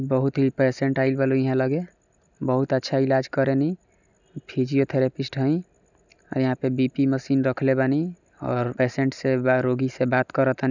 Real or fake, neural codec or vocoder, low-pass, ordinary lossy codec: real; none; 7.2 kHz; none